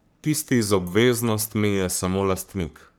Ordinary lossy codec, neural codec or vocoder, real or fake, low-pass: none; codec, 44.1 kHz, 3.4 kbps, Pupu-Codec; fake; none